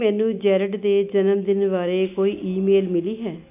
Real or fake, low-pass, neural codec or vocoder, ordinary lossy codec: real; 3.6 kHz; none; AAC, 32 kbps